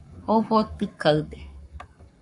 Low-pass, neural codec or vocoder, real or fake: 10.8 kHz; codec, 44.1 kHz, 7.8 kbps, Pupu-Codec; fake